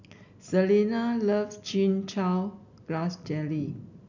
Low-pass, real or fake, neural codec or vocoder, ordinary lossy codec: 7.2 kHz; real; none; none